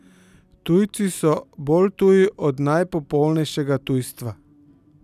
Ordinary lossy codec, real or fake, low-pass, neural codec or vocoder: none; real; 14.4 kHz; none